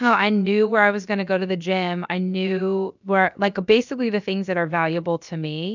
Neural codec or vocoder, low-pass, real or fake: codec, 16 kHz, about 1 kbps, DyCAST, with the encoder's durations; 7.2 kHz; fake